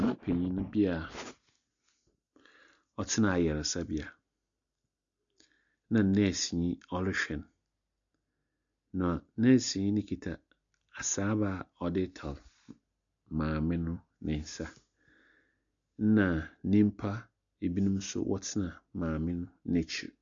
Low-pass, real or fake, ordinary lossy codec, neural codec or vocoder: 7.2 kHz; real; MP3, 64 kbps; none